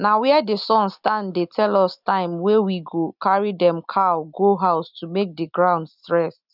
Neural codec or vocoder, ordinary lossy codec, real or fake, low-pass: none; none; real; 5.4 kHz